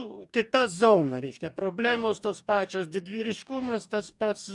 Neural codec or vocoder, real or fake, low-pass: codec, 44.1 kHz, 2.6 kbps, DAC; fake; 10.8 kHz